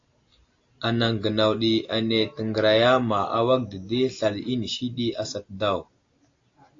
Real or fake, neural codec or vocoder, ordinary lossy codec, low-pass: real; none; AAC, 48 kbps; 7.2 kHz